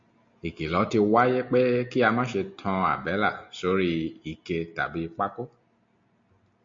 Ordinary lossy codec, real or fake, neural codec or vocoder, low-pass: MP3, 48 kbps; real; none; 7.2 kHz